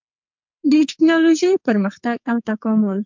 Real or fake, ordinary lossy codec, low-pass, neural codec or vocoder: fake; MP3, 64 kbps; 7.2 kHz; codec, 44.1 kHz, 2.6 kbps, SNAC